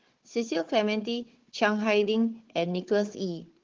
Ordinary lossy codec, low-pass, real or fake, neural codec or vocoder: Opus, 16 kbps; 7.2 kHz; fake; codec, 16 kHz, 4 kbps, FunCodec, trained on Chinese and English, 50 frames a second